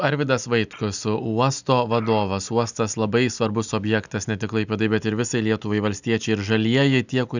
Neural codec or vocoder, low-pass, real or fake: none; 7.2 kHz; real